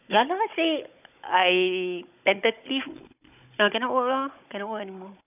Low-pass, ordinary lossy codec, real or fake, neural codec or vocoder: 3.6 kHz; none; fake; codec, 16 kHz, 16 kbps, FunCodec, trained on Chinese and English, 50 frames a second